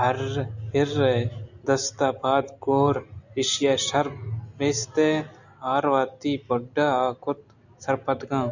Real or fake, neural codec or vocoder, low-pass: real; none; 7.2 kHz